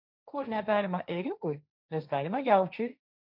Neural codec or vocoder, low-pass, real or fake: codec, 16 kHz, 1.1 kbps, Voila-Tokenizer; 5.4 kHz; fake